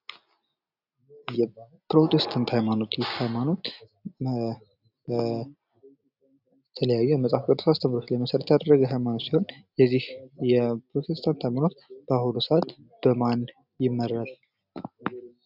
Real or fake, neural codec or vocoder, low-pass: real; none; 5.4 kHz